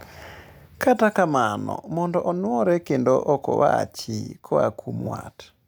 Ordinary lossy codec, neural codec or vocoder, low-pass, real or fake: none; none; none; real